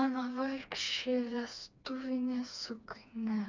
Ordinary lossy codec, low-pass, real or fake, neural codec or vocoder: MP3, 64 kbps; 7.2 kHz; fake; codec, 16 kHz, 2 kbps, FreqCodec, smaller model